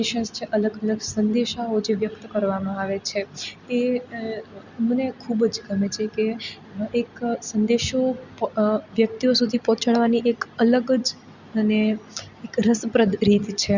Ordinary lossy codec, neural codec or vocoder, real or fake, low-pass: Opus, 64 kbps; none; real; 7.2 kHz